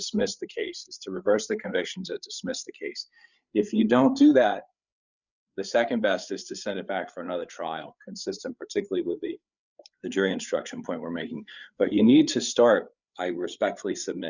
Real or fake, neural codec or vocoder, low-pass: fake; codec, 16 kHz, 8 kbps, FreqCodec, larger model; 7.2 kHz